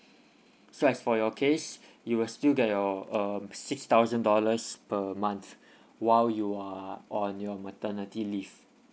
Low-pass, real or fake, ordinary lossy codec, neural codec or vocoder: none; real; none; none